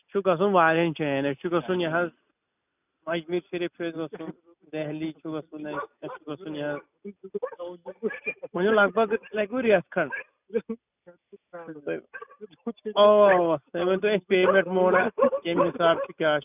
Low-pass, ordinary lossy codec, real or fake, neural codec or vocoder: 3.6 kHz; none; real; none